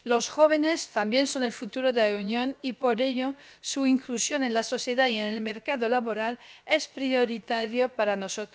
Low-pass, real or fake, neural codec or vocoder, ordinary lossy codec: none; fake; codec, 16 kHz, about 1 kbps, DyCAST, with the encoder's durations; none